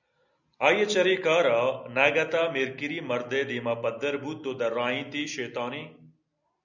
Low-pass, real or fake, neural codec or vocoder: 7.2 kHz; real; none